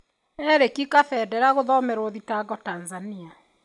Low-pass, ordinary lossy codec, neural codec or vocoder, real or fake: 10.8 kHz; none; none; real